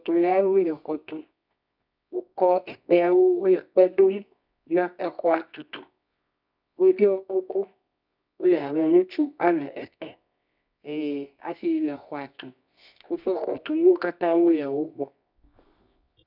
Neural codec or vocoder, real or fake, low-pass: codec, 24 kHz, 0.9 kbps, WavTokenizer, medium music audio release; fake; 5.4 kHz